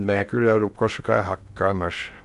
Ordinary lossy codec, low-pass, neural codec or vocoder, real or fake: none; 10.8 kHz; codec, 16 kHz in and 24 kHz out, 0.6 kbps, FocalCodec, streaming, 2048 codes; fake